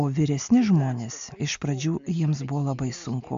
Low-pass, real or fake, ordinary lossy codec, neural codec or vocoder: 7.2 kHz; real; AAC, 64 kbps; none